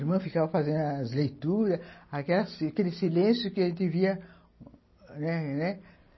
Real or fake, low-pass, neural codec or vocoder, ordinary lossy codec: real; 7.2 kHz; none; MP3, 24 kbps